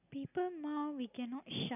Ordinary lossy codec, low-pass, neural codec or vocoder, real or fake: none; 3.6 kHz; none; real